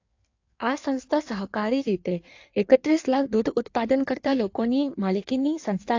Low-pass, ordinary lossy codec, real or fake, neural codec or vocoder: 7.2 kHz; AAC, 48 kbps; fake; codec, 16 kHz in and 24 kHz out, 1.1 kbps, FireRedTTS-2 codec